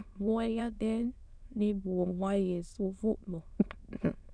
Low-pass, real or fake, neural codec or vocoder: 9.9 kHz; fake; autoencoder, 22.05 kHz, a latent of 192 numbers a frame, VITS, trained on many speakers